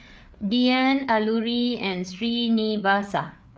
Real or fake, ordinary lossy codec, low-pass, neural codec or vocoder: fake; none; none; codec, 16 kHz, 4 kbps, FreqCodec, larger model